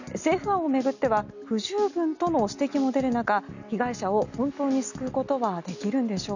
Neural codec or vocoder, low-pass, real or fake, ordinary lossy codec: none; 7.2 kHz; real; none